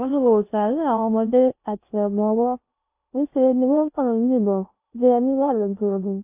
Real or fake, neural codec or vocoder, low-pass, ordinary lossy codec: fake; codec, 16 kHz in and 24 kHz out, 0.6 kbps, FocalCodec, streaming, 2048 codes; 3.6 kHz; AAC, 32 kbps